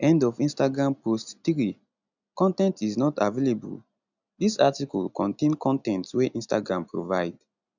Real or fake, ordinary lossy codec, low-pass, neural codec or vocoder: real; none; 7.2 kHz; none